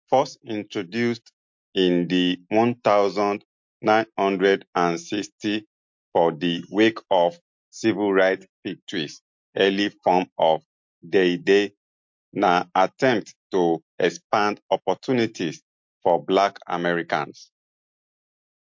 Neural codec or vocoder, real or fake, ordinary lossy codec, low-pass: none; real; MP3, 48 kbps; 7.2 kHz